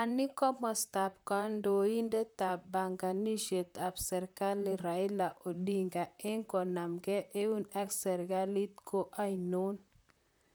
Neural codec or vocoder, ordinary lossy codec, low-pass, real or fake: vocoder, 44.1 kHz, 128 mel bands, Pupu-Vocoder; none; none; fake